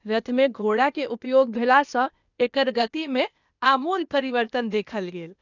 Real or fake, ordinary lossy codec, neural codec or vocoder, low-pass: fake; none; codec, 16 kHz, 0.8 kbps, ZipCodec; 7.2 kHz